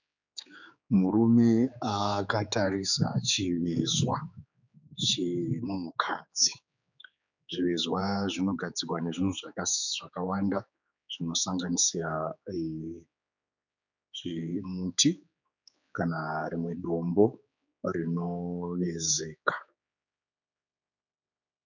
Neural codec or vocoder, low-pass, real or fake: codec, 16 kHz, 4 kbps, X-Codec, HuBERT features, trained on general audio; 7.2 kHz; fake